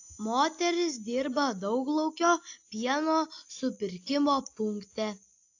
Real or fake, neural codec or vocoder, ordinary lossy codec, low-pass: real; none; AAC, 48 kbps; 7.2 kHz